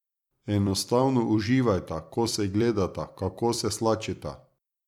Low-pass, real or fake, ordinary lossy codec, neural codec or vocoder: 19.8 kHz; fake; none; vocoder, 44.1 kHz, 128 mel bands every 256 samples, BigVGAN v2